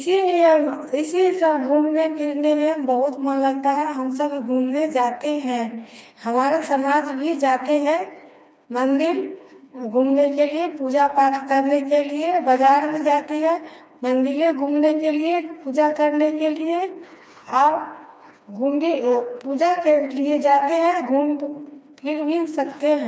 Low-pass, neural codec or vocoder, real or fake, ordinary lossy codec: none; codec, 16 kHz, 2 kbps, FreqCodec, smaller model; fake; none